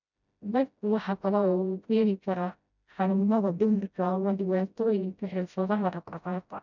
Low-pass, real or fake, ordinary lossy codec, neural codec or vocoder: 7.2 kHz; fake; none; codec, 16 kHz, 0.5 kbps, FreqCodec, smaller model